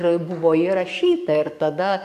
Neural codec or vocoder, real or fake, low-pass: codec, 44.1 kHz, 7.8 kbps, DAC; fake; 14.4 kHz